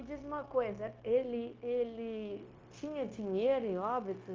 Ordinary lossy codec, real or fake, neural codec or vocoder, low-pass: Opus, 32 kbps; fake; codec, 16 kHz, 0.9 kbps, LongCat-Audio-Codec; 7.2 kHz